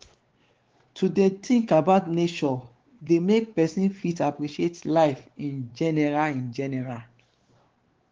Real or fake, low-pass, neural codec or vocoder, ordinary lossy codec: fake; 7.2 kHz; codec, 16 kHz, 4 kbps, X-Codec, WavLM features, trained on Multilingual LibriSpeech; Opus, 16 kbps